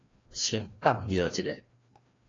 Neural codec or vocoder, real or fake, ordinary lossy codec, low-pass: codec, 16 kHz, 1 kbps, FreqCodec, larger model; fake; AAC, 32 kbps; 7.2 kHz